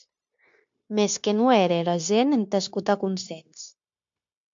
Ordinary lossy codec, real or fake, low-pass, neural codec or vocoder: AAC, 64 kbps; fake; 7.2 kHz; codec, 16 kHz, 0.9 kbps, LongCat-Audio-Codec